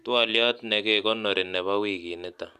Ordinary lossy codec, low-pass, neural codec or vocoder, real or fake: none; 14.4 kHz; none; real